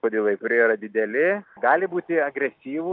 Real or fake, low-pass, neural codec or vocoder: real; 5.4 kHz; none